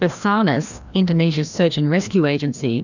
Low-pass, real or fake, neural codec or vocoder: 7.2 kHz; fake; codec, 16 kHz, 1 kbps, FreqCodec, larger model